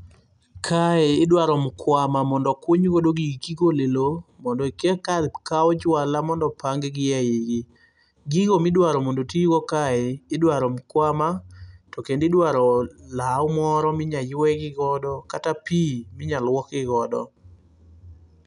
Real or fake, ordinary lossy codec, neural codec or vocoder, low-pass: real; none; none; 10.8 kHz